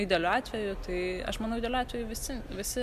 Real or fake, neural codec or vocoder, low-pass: real; none; 14.4 kHz